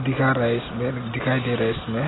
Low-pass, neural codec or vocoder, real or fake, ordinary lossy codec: 7.2 kHz; none; real; AAC, 16 kbps